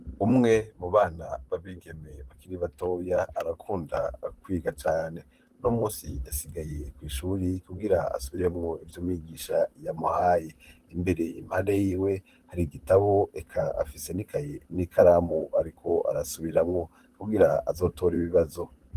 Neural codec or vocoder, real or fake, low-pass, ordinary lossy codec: vocoder, 44.1 kHz, 128 mel bands, Pupu-Vocoder; fake; 14.4 kHz; Opus, 24 kbps